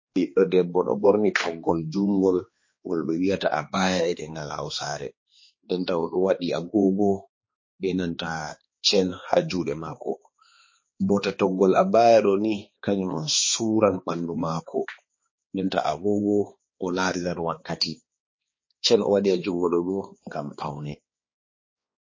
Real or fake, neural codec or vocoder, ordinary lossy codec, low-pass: fake; codec, 16 kHz, 2 kbps, X-Codec, HuBERT features, trained on balanced general audio; MP3, 32 kbps; 7.2 kHz